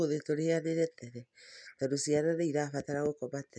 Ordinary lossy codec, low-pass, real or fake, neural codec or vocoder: none; 9.9 kHz; real; none